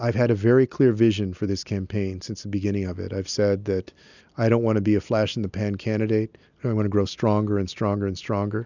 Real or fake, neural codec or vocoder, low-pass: real; none; 7.2 kHz